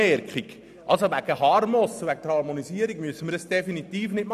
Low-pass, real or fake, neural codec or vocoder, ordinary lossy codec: 14.4 kHz; real; none; none